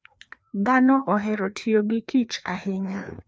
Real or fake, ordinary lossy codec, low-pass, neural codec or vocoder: fake; none; none; codec, 16 kHz, 2 kbps, FreqCodec, larger model